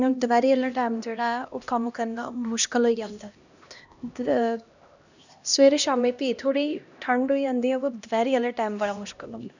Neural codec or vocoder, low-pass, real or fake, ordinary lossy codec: codec, 16 kHz, 1 kbps, X-Codec, HuBERT features, trained on LibriSpeech; 7.2 kHz; fake; none